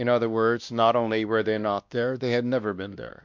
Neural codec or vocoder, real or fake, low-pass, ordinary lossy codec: codec, 16 kHz, 1 kbps, X-Codec, WavLM features, trained on Multilingual LibriSpeech; fake; 7.2 kHz; MP3, 64 kbps